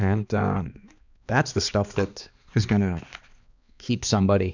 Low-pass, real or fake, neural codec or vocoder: 7.2 kHz; fake; codec, 16 kHz, 2 kbps, X-Codec, HuBERT features, trained on balanced general audio